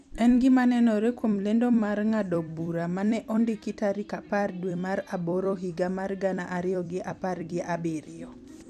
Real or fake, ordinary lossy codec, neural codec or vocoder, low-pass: fake; none; vocoder, 44.1 kHz, 128 mel bands every 256 samples, BigVGAN v2; 14.4 kHz